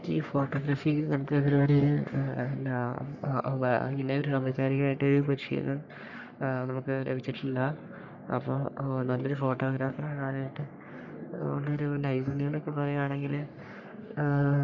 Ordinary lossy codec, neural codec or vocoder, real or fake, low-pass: none; codec, 44.1 kHz, 3.4 kbps, Pupu-Codec; fake; 7.2 kHz